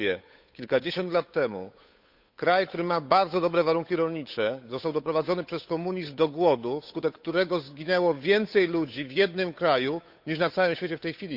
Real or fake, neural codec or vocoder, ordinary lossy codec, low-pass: fake; codec, 16 kHz, 8 kbps, FunCodec, trained on Chinese and English, 25 frames a second; none; 5.4 kHz